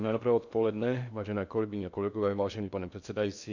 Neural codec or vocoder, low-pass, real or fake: codec, 16 kHz in and 24 kHz out, 0.6 kbps, FocalCodec, streaming, 2048 codes; 7.2 kHz; fake